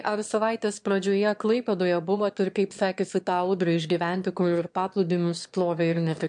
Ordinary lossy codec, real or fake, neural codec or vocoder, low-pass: MP3, 64 kbps; fake; autoencoder, 22.05 kHz, a latent of 192 numbers a frame, VITS, trained on one speaker; 9.9 kHz